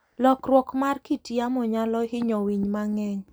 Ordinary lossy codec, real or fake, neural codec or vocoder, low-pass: none; real; none; none